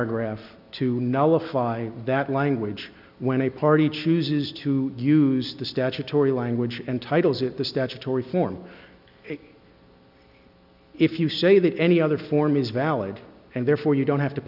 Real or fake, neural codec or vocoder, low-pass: real; none; 5.4 kHz